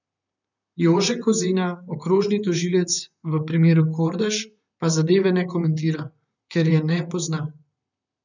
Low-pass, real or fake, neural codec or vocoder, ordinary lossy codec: 7.2 kHz; fake; vocoder, 44.1 kHz, 128 mel bands, Pupu-Vocoder; none